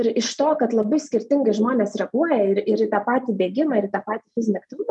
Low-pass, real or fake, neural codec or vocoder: 10.8 kHz; real; none